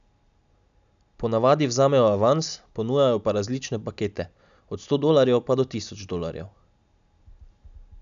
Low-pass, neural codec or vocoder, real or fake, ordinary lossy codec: 7.2 kHz; none; real; MP3, 96 kbps